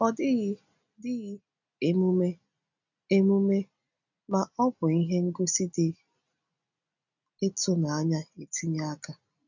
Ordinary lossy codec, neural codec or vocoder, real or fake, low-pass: none; none; real; 7.2 kHz